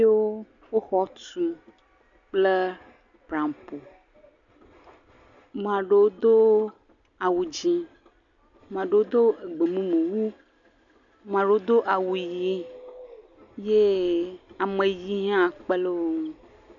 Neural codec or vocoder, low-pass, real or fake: none; 7.2 kHz; real